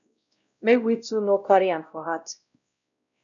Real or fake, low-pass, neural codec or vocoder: fake; 7.2 kHz; codec, 16 kHz, 0.5 kbps, X-Codec, WavLM features, trained on Multilingual LibriSpeech